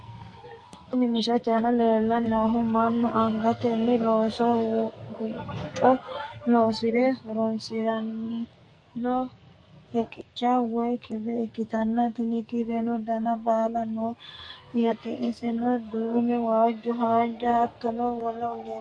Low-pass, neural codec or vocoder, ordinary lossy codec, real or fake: 9.9 kHz; codec, 44.1 kHz, 2.6 kbps, SNAC; MP3, 48 kbps; fake